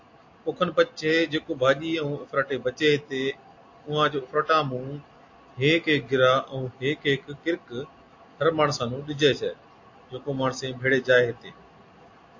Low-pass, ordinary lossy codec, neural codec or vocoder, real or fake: 7.2 kHz; AAC, 48 kbps; none; real